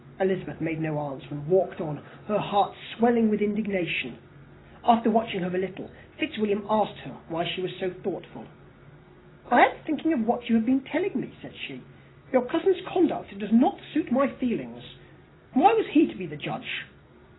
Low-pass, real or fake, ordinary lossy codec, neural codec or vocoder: 7.2 kHz; real; AAC, 16 kbps; none